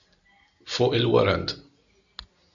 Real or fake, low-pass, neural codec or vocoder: real; 7.2 kHz; none